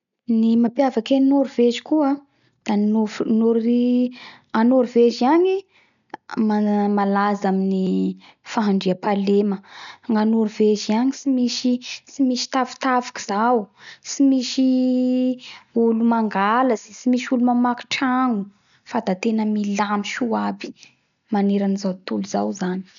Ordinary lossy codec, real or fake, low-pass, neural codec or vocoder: none; real; 7.2 kHz; none